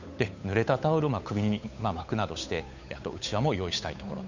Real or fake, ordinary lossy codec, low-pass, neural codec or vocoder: fake; none; 7.2 kHz; codec, 16 kHz, 8 kbps, FunCodec, trained on Chinese and English, 25 frames a second